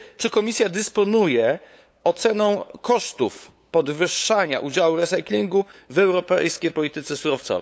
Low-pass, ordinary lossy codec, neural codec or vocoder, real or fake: none; none; codec, 16 kHz, 8 kbps, FunCodec, trained on LibriTTS, 25 frames a second; fake